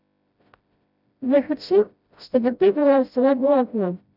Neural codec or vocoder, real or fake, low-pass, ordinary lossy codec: codec, 16 kHz, 0.5 kbps, FreqCodec, smaller model; fake; 5.4 kHz; none